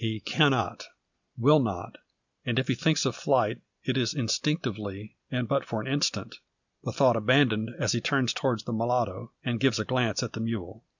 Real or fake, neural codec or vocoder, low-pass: real; none; 7.2 kHz